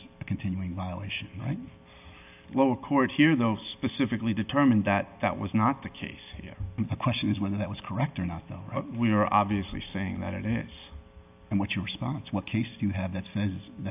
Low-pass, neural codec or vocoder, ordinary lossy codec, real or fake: 3.6 kHz; none; AAC, 32 kbps; real